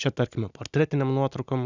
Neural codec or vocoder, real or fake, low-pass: none; real; 7.2 kHz